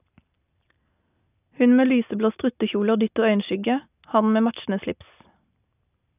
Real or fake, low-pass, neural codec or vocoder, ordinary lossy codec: real; 3.6 kHz; none; none